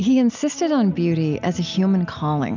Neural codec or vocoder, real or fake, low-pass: none; real; 7.2 kHz